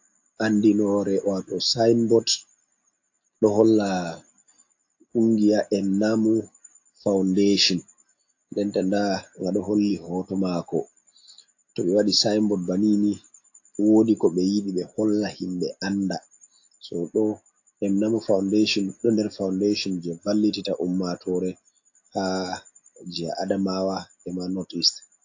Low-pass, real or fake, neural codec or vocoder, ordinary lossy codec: 7.2 kHz; real; none; AAC, 48 kbps